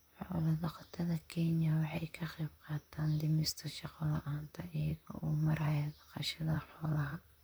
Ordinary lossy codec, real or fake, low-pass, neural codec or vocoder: none; fake; none; vocoder, 44.1 kHz, 128 mel bands, Pupu-Vocoder